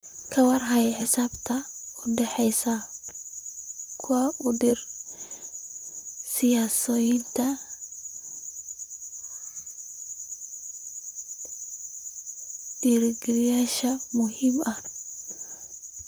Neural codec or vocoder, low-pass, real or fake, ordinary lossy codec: vocoder, 44.1 kHz, 128 mel bands, Pupu-Vocoder; none; fake; none